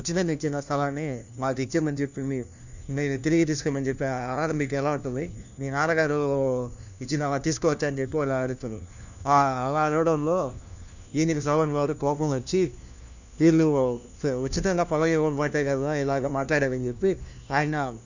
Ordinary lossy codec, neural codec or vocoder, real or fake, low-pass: none; codec, 16 kHz, 1 kbps, FunCodec, trained on LibriTTS, 50 frames a second; fake; 7.2 kHz